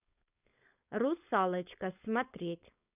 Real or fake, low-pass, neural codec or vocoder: fake; 3.6 kHz; codec, 16 kHz, 4.8 kbps, FACodec